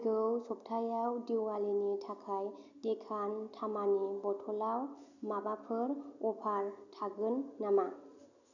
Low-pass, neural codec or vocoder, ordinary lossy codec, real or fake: 7.2 kHz; none; none; real